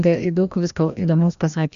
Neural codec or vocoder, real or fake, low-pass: codec, 16 kHz, 1 kbps, FreqCodec, larger model; fake; 7.2 kHz